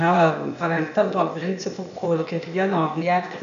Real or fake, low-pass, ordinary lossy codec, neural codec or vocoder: fake; 7.2 kHz; MP3, 64 kbps; codec, 16 kHz, 0.8 kbps, ZipCodec